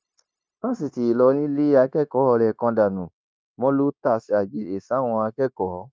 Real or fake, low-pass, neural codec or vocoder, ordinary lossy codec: fake; none; codec, 16 kHz, 0.9 kbps, LongCat-Audio-Codec; none